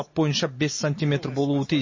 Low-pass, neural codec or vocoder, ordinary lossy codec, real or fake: 7.2 kHz; none; MP3, 32 kbps; real